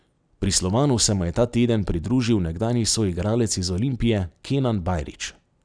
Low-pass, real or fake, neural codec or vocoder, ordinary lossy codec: 9.9 kHz; real; none; none